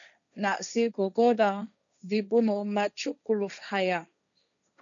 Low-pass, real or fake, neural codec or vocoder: 7.2 kHz; fake; codec, 16 kHz, 1.1 kbps, Voila-Tokenizer